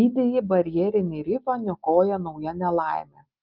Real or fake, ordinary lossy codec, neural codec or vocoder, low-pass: real; Opus, 32 kbps; none; 5.4 kHz